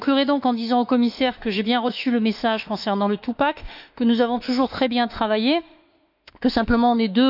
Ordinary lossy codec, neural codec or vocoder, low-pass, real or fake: none; autoencoder, 48 kHz, 32 numbers a frame, DAC-VAE, trained on Japanese speech; 5.4 kHz; fake